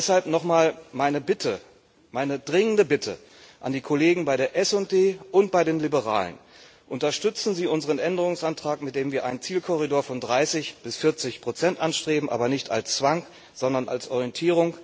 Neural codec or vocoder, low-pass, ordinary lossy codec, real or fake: none; none; none; real